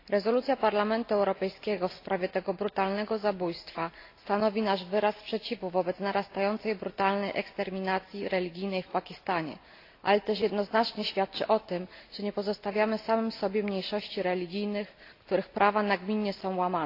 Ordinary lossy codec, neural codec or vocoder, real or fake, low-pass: AAC, 32 kbps; none; real; 5.4 kHz